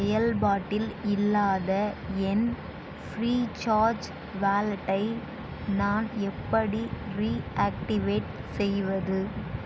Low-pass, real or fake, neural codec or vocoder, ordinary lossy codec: none; real; none; none